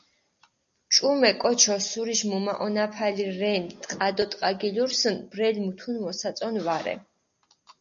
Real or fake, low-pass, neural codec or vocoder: real; 7.2 kHz; none